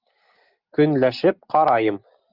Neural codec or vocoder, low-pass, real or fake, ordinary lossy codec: none; 5.4 kHz; real; Opus, 24 kbps